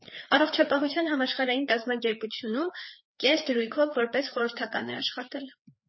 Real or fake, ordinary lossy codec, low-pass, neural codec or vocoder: fake; MP3, 24 kbps; 7.2 kHz; codec, 16 kHz, 4 kbps, FreqCodec, larger model